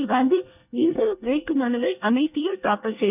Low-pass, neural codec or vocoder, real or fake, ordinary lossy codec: 3.6 kHz; codec, 24 kHz, 1 kbps, SNAC; fake; none